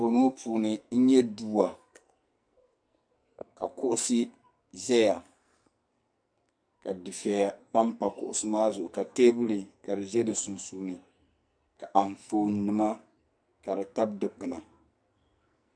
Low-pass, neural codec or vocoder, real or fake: 9.9 kHz; codec, 44.1 kHz, 2.6 kbps, SNAC; fake